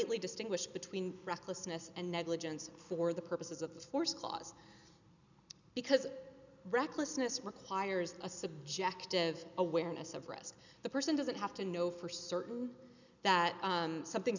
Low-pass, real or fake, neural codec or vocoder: 7.2 kHz; real; none